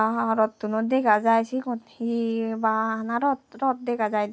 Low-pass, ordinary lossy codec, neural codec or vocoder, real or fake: none; none; none; real